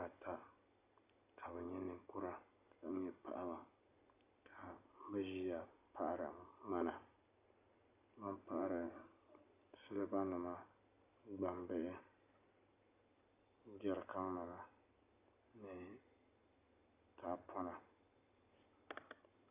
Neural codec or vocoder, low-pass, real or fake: none; 3.6 kHz; real